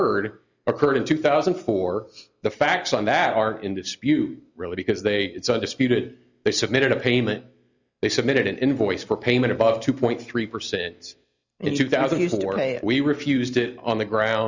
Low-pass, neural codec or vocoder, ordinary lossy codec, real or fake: 7.2 kHz; none; Opus, 64 kbps; real